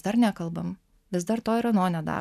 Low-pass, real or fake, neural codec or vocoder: 14.4 kHz; real; none